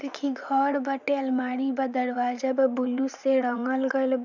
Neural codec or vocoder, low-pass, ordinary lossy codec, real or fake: vocoder, 44.1 kHz, 80 mel bands, Vocos; 7.2 kHz; none; fake